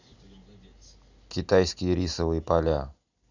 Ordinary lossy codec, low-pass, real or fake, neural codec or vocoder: none; 7.2 kHz; real; none